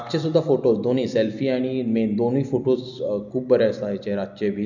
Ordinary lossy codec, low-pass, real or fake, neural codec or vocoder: none; 7.2 kHz; real; none